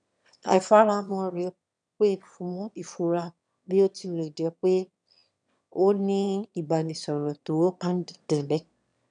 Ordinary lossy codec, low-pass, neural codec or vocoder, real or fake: none; 9.9 kHz; autoencoder, 22.05 kHz, a latent of 192 numbers a frame, VITS, trained on one speaker; fake